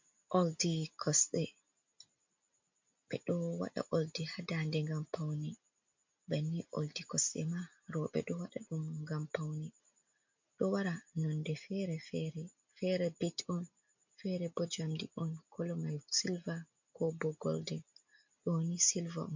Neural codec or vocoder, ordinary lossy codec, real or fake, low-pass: none; MP3, 48 kbps; real; 7.2 kHz